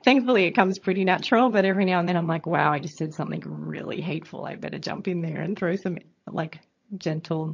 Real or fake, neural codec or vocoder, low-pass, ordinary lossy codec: fake; vocoder, 22.05 kHz, 80 mel bands, HiFi-GAN; 7.2 kHz; MP3, 48 kbps